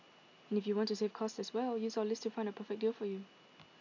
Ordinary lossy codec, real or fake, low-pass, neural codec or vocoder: MP3, 64 kbps; real; 7.2 kHz; none